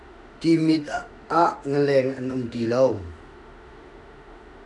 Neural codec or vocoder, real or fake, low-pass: autoencoder, 48 kHz, 32 numbers a frame, DAC-VAE, trained on Japanese speech; fake; 10.8 kHz